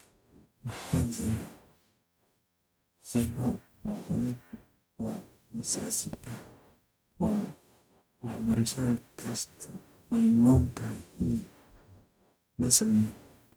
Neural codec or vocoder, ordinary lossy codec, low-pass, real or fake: codec, 44.1 kHz, 0.9 kbps, DAC; none; none; fake